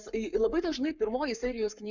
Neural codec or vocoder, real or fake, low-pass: codec, 44.1 kHz, 7.8 kbps, Pupu-Codec; fake; 7.2 kHz